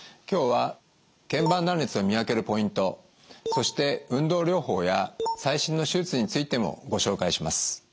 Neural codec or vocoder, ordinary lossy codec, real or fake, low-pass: none; none; real; none